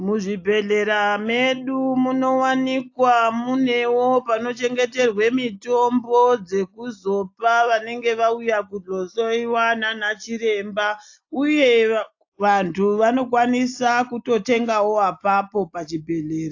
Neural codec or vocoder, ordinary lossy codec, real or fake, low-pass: none; AAC, 48 kbps; real; 7.2 kHz